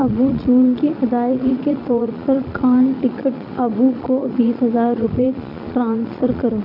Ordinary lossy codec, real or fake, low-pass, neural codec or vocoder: AAC, 48 kbps; fake; 5.4 kHz; vocoder, 22.05 kHz, 80 mel bands, Vocos